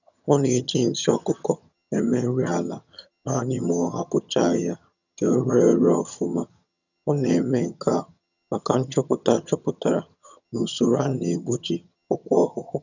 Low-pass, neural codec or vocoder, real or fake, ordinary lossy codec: 7.2 kHz; vocoder, 22.05 kHz, 80 mel bands, HiFi-GAN; fake; none